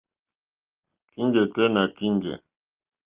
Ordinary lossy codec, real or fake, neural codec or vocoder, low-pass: Opus, 32 kbps; real; none; 3.6 kHz